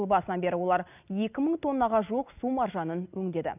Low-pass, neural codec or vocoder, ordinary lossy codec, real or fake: 3.6 kHz; none; none; real